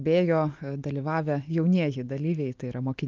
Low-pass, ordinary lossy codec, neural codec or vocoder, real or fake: 7.2 kHz; Opus, 24 kbps; none; real